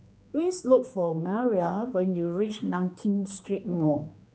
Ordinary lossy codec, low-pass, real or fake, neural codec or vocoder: none; none; fake; codec, 16 kHz, 2 kbps, X-Codec, HuBERT features, trained on general audio